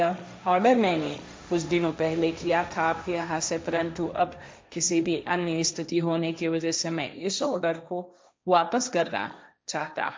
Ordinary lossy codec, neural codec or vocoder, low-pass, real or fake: none; codec, 16 kHz, 1.1 kbps, Voila-Tokenizer; none; fake